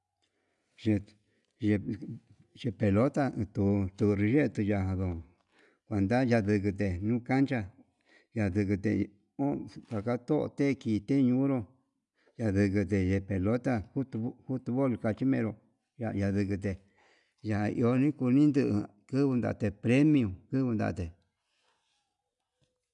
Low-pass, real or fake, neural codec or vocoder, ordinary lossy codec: 10.8 kHz; real; none; none